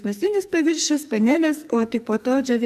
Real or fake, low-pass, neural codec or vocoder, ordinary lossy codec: fake; 14.4 kHz; codec, 44.1 kHz, 2.6 kbps, SNAC; MP3, 96 kbps